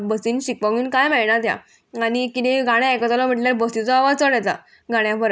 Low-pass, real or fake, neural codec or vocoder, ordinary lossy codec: none; real; none; none